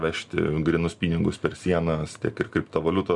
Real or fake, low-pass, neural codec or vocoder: real; 10.8 kHz; none